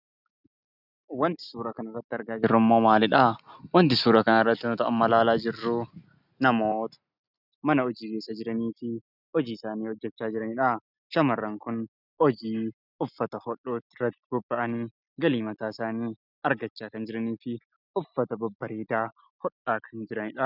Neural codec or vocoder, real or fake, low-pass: none; real; 5.4 kHz